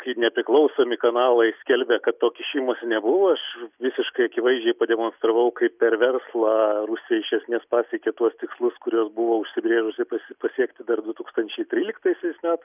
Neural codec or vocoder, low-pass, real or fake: none; 3.6 kHz; real